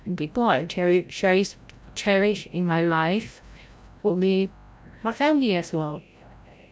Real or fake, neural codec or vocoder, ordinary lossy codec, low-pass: fake; codec, 16 kHz, 0.5 kbps, FreqCodec, larger model; none; none